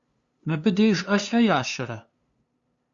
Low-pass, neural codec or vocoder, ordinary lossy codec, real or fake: 7.2 kHz; codec, 16 kHz, 2 kbps, FunCodec, trained on LibriTTS, 25 frames a second; Opus, 64 kbps; fake